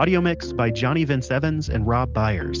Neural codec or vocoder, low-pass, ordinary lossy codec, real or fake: none; 7.2 kHz; Opus, 32 kbps; real